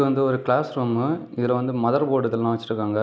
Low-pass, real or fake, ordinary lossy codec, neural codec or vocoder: none; real; none; none